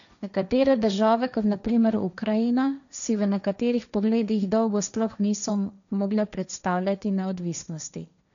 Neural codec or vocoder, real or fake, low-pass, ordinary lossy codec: codec, 16 kHz, 1.1 kbps, Voila-Tokenizer; fake; 7.2 kHz; none